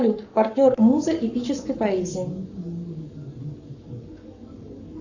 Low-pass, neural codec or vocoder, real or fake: 7.2 kHz; codec, 44.1 kHz, 7.8 kbps, Pupu-Codec; fake